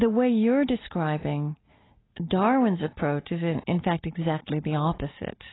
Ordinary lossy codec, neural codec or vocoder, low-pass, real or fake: AAC, 16 kbps; none; 7.2 kHz; real